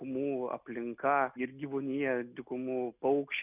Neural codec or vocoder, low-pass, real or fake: none; 3.6 kHz; real